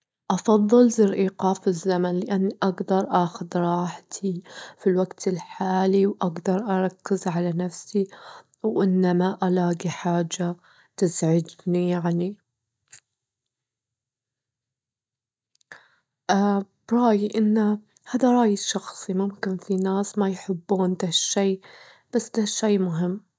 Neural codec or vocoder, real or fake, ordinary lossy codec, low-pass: none; real; none; none